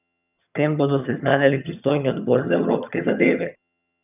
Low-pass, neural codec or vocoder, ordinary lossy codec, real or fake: 3.6 kHz; vocoder, 22.05 kHz, 80 mel bands, HiFi-GAN; none; fake